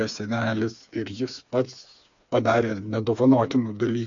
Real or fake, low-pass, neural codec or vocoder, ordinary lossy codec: fake; 7.2 kHz; codec, 16 kHz, 4 kbps, FreqCodec, smaller model; AAC, 64 kbps